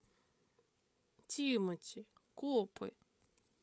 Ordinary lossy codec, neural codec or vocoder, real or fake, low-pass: none; codec, 16 kHz, 8 kbps, FreqCodec, larger model; fake; none